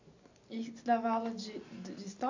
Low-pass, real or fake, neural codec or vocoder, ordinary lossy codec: 7.2 kHz; real; none; AAC, 48 kbps